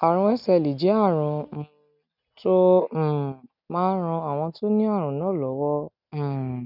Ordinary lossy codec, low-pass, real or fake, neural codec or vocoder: none; 5.4 kHz; real; none